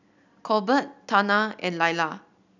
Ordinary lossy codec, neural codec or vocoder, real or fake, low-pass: none; none; real; 7.2 kHz